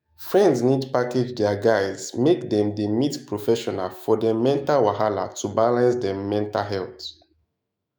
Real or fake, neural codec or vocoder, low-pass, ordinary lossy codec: fake; autoencoder, 48 kHz, 128 numbers a frame, DAC-VAE, trained on Japanese speech; none; none